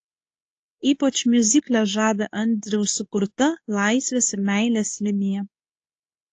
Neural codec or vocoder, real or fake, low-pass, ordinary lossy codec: codec, 24 kHz, 0.9 kbps, WavTokenizer, medium speech release version 2; fake; 10.8 kHz; AAC, 48 kbps